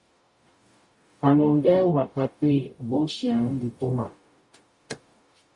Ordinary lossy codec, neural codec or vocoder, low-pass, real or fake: MP3, 48 kbps; codec, 44.1 kHz, 0.9 kbps, DAC; 10.8 kHz; fake